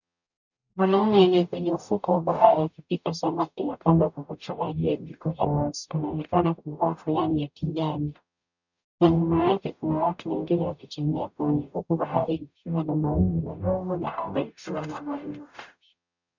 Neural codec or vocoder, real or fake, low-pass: codec, 44.1 kHz, 0.9 kbps, DAC; fake; 7.2 kHz